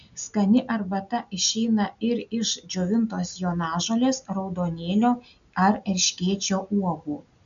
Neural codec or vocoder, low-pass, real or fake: none; 7.2 kHz; real